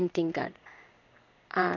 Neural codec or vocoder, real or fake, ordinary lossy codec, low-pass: codec, 16 kHz in and 24 kHz out, 1 kbps, XY-Tokenizer; fake; none; 7.2 kHz